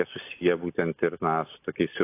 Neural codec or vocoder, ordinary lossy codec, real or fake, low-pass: none; AAC, 24 kbps; real; 3.6 kHz